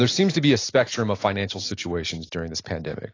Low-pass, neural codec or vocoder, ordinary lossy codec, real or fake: 7.2 kHz; none; AAC, 32 kbps; real